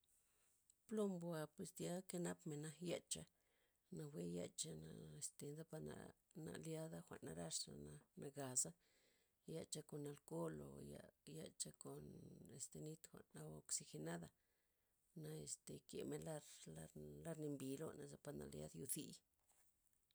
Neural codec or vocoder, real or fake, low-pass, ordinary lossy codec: none; real; none; none